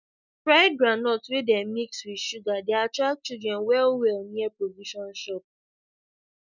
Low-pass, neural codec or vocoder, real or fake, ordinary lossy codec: 7.2 kHz; none; real; none